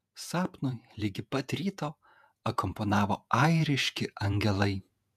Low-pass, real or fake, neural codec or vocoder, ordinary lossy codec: 14.4 kHz; fake; vocoder, 48 kHz, 128 mel bands, Vocos; MP3, 96 kbps